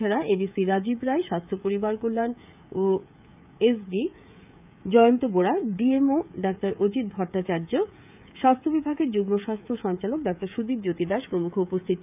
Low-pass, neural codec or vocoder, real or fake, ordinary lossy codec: 3.6 kHz; codec, 16 kHz, 16 kbps, FreqCodec, smaller model; fake; none